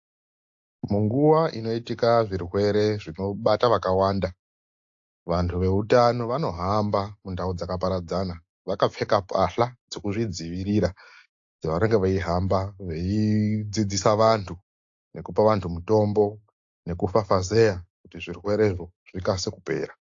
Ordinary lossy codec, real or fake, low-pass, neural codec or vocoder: AAC, 48 kbps; real; 7.2 kHz; none